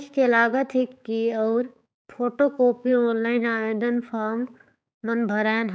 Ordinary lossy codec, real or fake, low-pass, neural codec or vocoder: none; fake; none; codec, 16 kHz, 4 kbps, X-Codec, HuBERT features, trained on balanced general audio